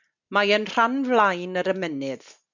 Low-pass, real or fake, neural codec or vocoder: 7.2 kHz; real; none